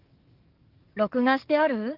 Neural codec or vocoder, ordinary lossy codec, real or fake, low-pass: codec, 44.1 kHz, 7.8 kbps, DAC; Opus, 24 kbps; fake; 5.4 kHz